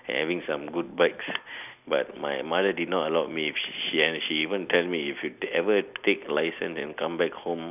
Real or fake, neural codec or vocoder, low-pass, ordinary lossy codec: real; none; 3.6 kHz; none